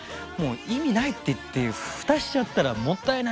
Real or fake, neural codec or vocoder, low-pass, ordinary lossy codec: real; none; none; none